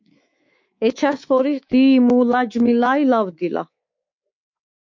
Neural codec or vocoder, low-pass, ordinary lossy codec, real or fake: codec, 24 kHz, 3.1 kbps, DualCodec; 7.2 kHz; MP3, 48 kbps; fake